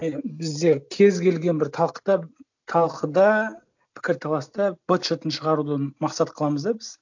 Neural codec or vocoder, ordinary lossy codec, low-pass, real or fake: none; none; 7.2 kHz; real